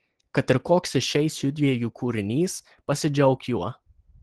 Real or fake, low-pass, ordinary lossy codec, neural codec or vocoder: real; 10.8 kHz; Opus, 16 kbps; none